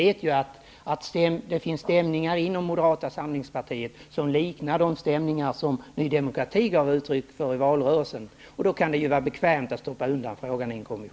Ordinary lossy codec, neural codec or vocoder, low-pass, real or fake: none; none; none; real